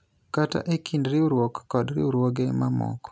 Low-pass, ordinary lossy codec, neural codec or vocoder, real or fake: none; none; none; real